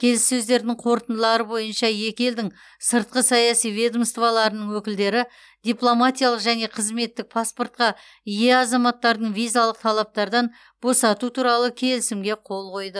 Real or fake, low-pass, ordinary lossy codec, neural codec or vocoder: real; none; none; none